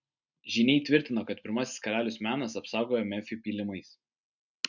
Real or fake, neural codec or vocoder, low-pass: real; none; 7.2 kHz